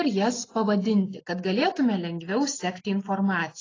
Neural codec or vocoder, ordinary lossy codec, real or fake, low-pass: none; AAC, 32 kbps; real; 7.2 kHz